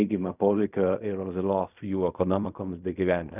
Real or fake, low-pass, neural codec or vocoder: fake; 3.6 kHz; codec, 16 kHz in and 24 kHz out, 0.4 kbps, LongCat-Audio-Codec, fine tuned four codebook decoder